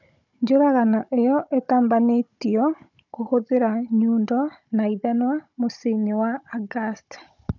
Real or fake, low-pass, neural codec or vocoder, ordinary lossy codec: fake; 7.2 kHz; codec, 16 kHz, 16 kbps, FunCodec, trained on Chinese and English, 50 frames a second; none